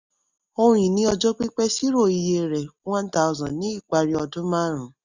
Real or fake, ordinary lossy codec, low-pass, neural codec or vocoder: real; none; 7.2 kHz; none